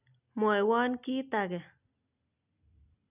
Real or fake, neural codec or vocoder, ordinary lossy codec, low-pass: real; none; none; 3.6 kHz